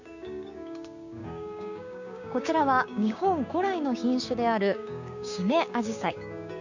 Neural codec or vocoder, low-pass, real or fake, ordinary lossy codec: codec, 16 kHz, 6 kbps, DAC; 7.2 kHz; fake; none